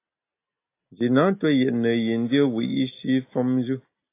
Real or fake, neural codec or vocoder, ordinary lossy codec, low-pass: real; none; AAC, 24 kbps; 3.6 kHz